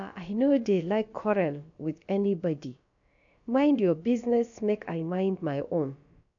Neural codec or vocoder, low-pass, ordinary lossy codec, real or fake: codec, 16 kHz, about 1 kbps, DyCAST, with the encoder's durations; 7.2 kHz; MP3, 96 kbps; fake